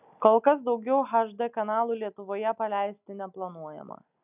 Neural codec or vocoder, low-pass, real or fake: none; 3.6 kHz; real